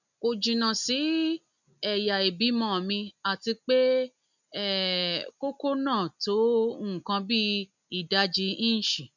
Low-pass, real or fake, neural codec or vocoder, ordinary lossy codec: 7.2 kHz; real; none; none